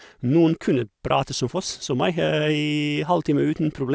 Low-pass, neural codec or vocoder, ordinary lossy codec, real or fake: none; none; none; real